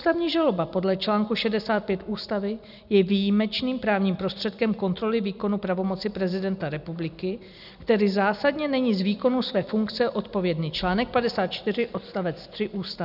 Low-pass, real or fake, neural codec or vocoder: 5.4 kHz; real; none